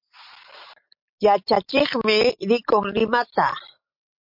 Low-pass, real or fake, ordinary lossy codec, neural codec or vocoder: 5.4 kHz; real; MP3, 48 kbps; none